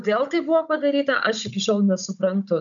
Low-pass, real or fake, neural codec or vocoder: 7.2 kHz; fake; codec, 16 kHz, 16 kbps, FunCodec, trained on Chinese and English, 50 frames a second